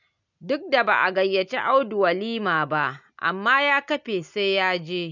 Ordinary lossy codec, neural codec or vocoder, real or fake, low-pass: none; none; real; 7.2 kHz